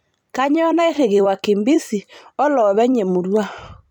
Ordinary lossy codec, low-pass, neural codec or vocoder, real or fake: none; 19.8 kHz; vocoder, 44.1 kHz, 128 mel bands every 256 samples, BigVGAN v2; fake